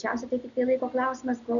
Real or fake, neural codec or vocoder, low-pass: real; none; 7.2 kHz